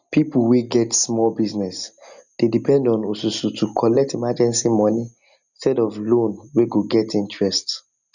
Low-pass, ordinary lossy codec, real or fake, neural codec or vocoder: 7.2 kHz; none; real; none